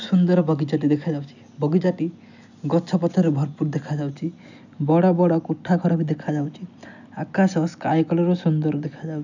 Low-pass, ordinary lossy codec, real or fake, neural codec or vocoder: 7.2 kHz; none; real; none